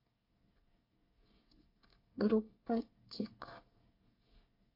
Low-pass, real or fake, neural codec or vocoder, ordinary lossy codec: 5.4 kHz; fake; codec, 24 kHz, 1 kbps, SNAC; MP3, 32 kbps